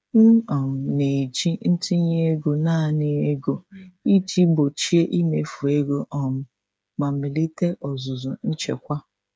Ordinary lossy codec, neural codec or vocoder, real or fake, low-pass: none; codec, 16 kHz, 8 kbps, FreqCodec, smaller model; fake; none